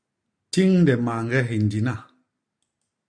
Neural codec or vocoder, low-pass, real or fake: none; 9.9 kHz; real